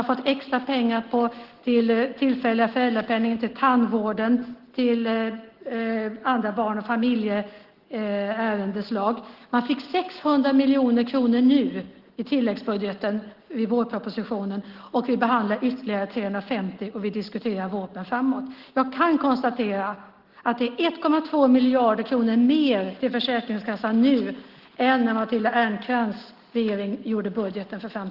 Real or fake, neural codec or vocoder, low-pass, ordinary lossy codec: real; none; 5.4 kHz; Opus, 16 kbps